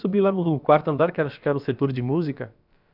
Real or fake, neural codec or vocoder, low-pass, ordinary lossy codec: fake; codec, 16 kHz, about 1 kbps, DyCAST, with the encoder's durations; 5.4 kHz; none